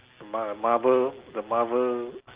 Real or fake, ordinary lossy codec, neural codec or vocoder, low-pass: real; Opus, 16 kbps; none; 3.6 kHz